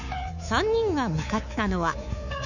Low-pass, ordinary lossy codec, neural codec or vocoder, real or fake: 7.2 kHz; none; codec, 24 kHz, 3.1 kbps, DualCodec; fake